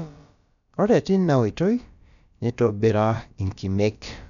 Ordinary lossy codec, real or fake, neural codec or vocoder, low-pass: none; fake; codec, 16 kHz, about 1 kbps, DyCAST, with the encoder's durations; 7.2 kHz